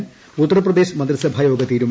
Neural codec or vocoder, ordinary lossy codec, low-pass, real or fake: none; none; none; real